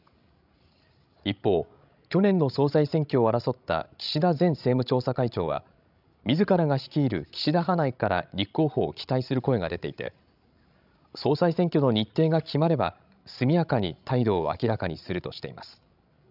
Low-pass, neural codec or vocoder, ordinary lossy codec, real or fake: 5.4 kHz; codec, 16 kHz, 16 kbps, FreqCodec, larger model; none; fake